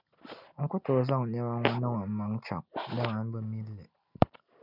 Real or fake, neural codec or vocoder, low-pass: real; none; 5.4 kHz